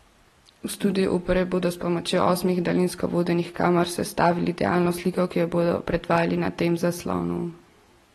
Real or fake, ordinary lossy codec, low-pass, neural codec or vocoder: real; AAC, 32 kbps; 14.4 kHz; none